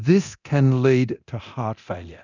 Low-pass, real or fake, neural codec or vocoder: 7.2 kHz; fake; codec, 24 kHz, 0.9 kbps, DualCodec